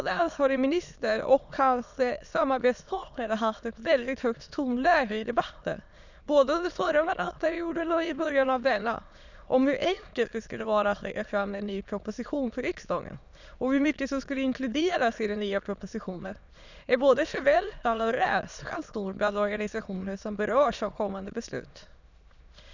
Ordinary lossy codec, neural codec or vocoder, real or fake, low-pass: none; autoencoder, 22.05 kHz, a latent of 192 numbers a frame, VITS, trained on many speakers; fake; 7.2 kHz